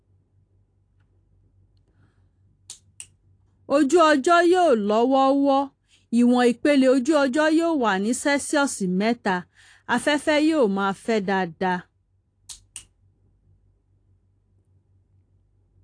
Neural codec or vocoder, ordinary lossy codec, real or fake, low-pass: none; AAC, 48 kbps; real; 9.9 kHz